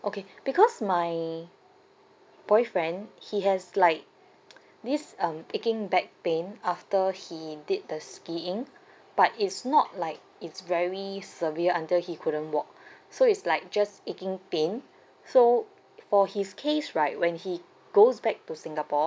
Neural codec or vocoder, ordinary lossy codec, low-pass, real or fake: none; none; none; real